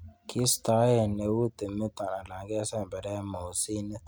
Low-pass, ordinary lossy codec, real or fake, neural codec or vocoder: none; none; real; none